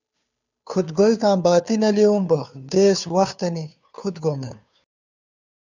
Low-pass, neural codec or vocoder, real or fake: 7.2 kHz; codec, 16 kHz, 2 kbps, FunCodec, trained on Chinese and English, 25 frames a second; fake